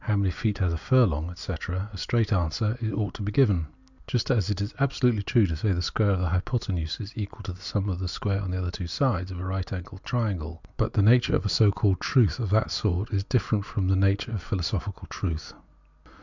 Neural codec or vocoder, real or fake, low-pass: none; real; 7.2 kHz